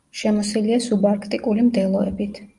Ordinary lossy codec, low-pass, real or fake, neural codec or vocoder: Opus, 32 kbps; 10.8 kHz; real; none